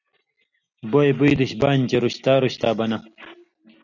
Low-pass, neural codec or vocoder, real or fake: 7.2 kHz; none; real